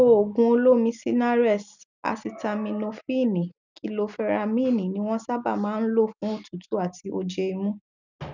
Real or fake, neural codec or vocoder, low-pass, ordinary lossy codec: real; none; 7.2 kHz; none